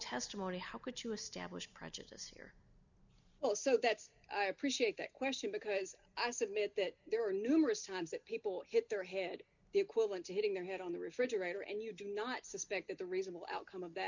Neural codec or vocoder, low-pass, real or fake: none; 7.2 kHz; real